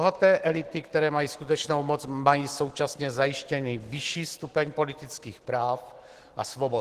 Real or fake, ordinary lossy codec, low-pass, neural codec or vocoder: fake; Opus, 16 kbps; 14.4 kHz; autoencoder, 48 kHz, 128 numbers a frame, DAC-VAE, trained on Japanese speech